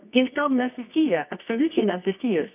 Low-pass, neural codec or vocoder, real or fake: 3.6 kHz; codec, 24 kHz, 0.9 kbps, WavTokenizer, medium music audio release; fake